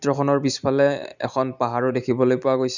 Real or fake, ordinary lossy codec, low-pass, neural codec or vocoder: real; none; 7.2 kHz; none